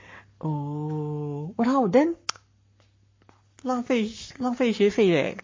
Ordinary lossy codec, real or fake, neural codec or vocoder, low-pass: MP3, 32 kbps; fake; codec, 44.1 kHz, 7.8 kbps, Pupu-Codec; 7.2 kHz